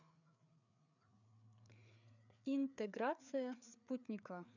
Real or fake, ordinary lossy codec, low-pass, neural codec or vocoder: fake; none; 7.2 kHz; codec, 16 kHz, 4 kbps, FreqCodec, larger model